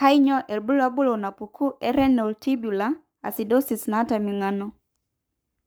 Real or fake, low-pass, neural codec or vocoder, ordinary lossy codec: fake; none; codec, 44.1 kHz, 7.8 kbps, Pupu-Codec; none